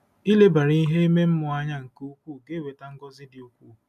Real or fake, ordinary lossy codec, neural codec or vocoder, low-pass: real; none; none; 14.4 kHz